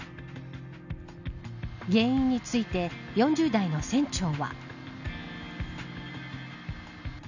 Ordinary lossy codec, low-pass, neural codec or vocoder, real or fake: none; 7.2 kHz; none; real